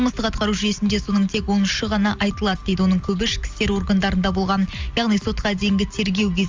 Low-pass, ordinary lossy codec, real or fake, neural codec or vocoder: 7.2 kHz; Opus, 32 kbps; real; none